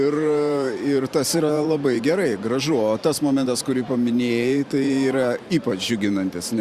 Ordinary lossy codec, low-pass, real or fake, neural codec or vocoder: Opus, 64 kbps; 14.4 kHz; fake; vocoder, 44.1 kHz, 128 mel bands every 512 samples, BigVGAN v2